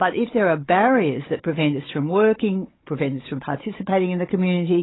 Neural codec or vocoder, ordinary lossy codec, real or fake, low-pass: codec, 16 kHz, 16 kbps, FunCodec, trained on Chinese and English, 50 frames a second; AAC, 16 kbps; fake; 7.2 kHz